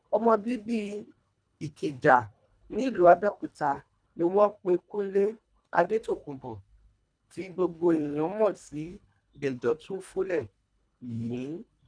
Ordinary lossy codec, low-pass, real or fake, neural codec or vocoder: none; 9.9 kHz; fake; codec, 24 kHz, 1.5 kbps, HILCodec